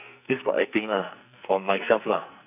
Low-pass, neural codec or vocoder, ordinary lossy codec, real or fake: 3.6 kHz; codec, 44.1 kHz, 2.6 kbps, SNAC; none; fake